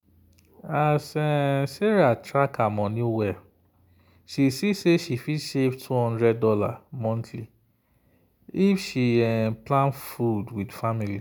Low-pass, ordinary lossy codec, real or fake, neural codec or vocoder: none; none; real; none